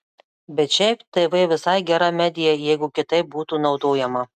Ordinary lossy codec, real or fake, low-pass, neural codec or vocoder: MP3, 96 kbps; real; 14.4 kHz; none